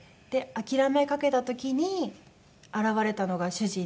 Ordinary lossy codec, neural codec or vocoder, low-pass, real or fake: none; none; none; real